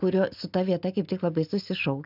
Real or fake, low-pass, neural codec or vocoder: real; 5.4 kHz; none